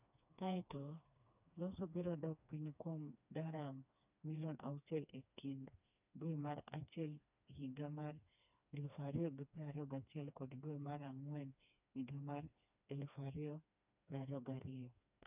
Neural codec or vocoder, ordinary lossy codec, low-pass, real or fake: codec, 16 kHz, 2 kbps, FreqCodec, smaller model; none; 3.6 kHz; fake